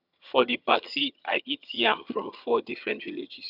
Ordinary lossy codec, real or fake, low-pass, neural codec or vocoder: none; fake; 5.4 kHz; vocoder, 22.05 kHz, 80 mel bands, HiFi-GAN